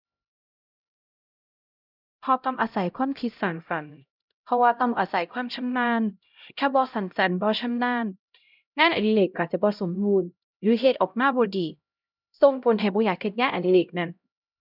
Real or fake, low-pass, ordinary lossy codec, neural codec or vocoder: fake; 5.4 kHz; none; codec, 16 kHz, 0.5 kbps, X-Codec, HuBERT features, trained on LibriSpeech